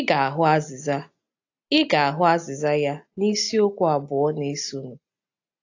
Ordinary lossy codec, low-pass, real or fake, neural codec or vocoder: AAC, 48 kbps; 7.2 kHz; real; none